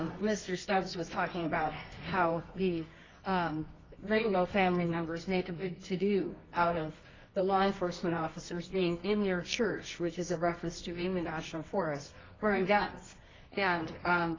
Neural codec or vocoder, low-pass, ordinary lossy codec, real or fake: codec, 24 kHz, 0.9 kbps, WavTokenizer, medium music audio release; 7.2 kHz; AAC, 32 kbps; fake